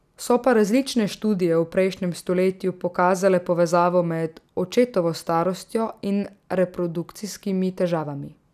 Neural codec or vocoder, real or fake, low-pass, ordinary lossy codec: none; real; 14.4 kHz; none